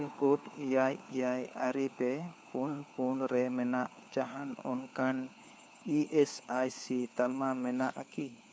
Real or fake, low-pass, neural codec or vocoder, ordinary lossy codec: fake; none; codec, 16 kHz, 4 kbps, FunCodec, trained on LibriTTS, 50 frames a second; none